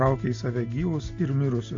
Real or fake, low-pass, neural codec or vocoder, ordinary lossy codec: real; 7.2 kHz; none; AAC, 48 kbps